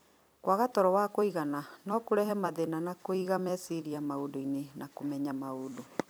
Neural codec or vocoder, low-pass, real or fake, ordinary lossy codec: vocoder, 44.1 kHz, 128 mel bands every 256 samples, BigVGAN v2; none; fake; none